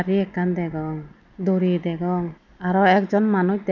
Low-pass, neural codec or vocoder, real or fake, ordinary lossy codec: 7.2 kHz; none; real; none